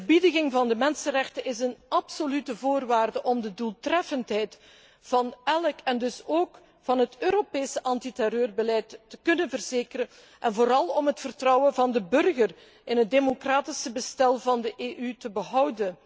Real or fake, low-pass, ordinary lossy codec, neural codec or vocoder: real; none; none; none